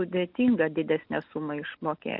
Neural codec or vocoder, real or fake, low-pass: none; real; 5.4 kHz